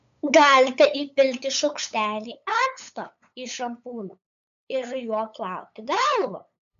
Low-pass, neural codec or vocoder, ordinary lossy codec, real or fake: 7.2 kHz; codec, 16 kHz, 8 kbps, FunCodec, trained on LibriTTS, 25 frames a second; AAC, 96 kbps; fake